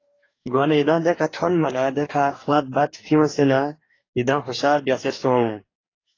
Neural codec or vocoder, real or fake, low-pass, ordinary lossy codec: codec, 44.1 kHz, 2.6 kbps, DAC; fake; 7.2 kHz; AAC, 32 kbps